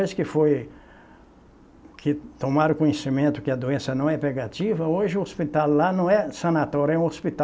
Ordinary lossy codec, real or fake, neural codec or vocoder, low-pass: none; real; none; none